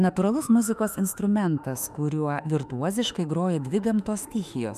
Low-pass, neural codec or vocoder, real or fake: 14.4 kHz; autoencoder, 48 kHz, 32 numbers a frame, DAC-VAE, trained on Japanese speech; fake